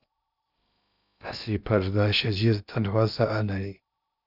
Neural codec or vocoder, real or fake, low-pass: codec, 16 kHz in and 24 kHz out, 0.6 kbps, FocalCodec, streaming, 2048 codes; fake; 5.4 kHz